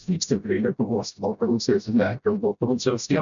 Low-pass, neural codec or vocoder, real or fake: 7.2 kHz; codec, 16 kHz, 0.5 kbps, FreqCodec, smaller model; fake